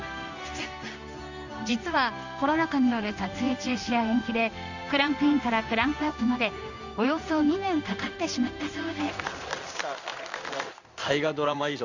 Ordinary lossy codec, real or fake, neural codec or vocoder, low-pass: none; fake; codec, 16 kHz in and 24 kHz out, 1 kbps, XY-Tokenizer; 7.2 kHz